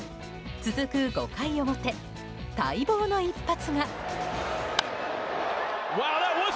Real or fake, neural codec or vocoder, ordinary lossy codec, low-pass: real; none; none; none